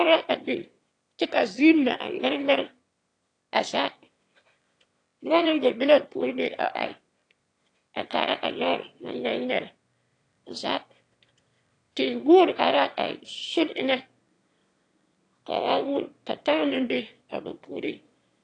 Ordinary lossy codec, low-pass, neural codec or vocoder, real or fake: AAC, 48 kbps; 9.9 kHz; autoencoder, 22.05 kHz, a latent of 192 numbers a frame, VITS, trained on one speaker; fake